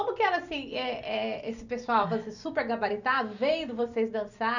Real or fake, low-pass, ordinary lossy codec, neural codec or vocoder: real; 7.2 kHz; none; none